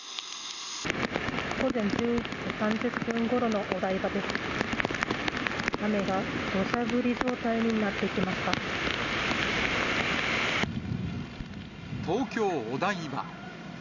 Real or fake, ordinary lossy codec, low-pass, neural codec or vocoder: real; none; 7.2 kHz; none